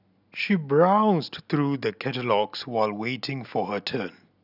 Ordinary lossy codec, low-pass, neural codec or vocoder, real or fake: none; 5.4 kHz; none; real